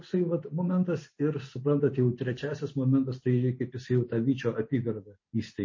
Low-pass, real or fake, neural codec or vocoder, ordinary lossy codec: 7.2 kHz; real; none; MP3, 32 kbps